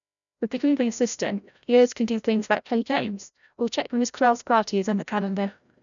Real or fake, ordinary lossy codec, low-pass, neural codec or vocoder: fake; none; 7.2 kHz; codec, 16 kHz, 0.5 kbps, FreqCodec, larger model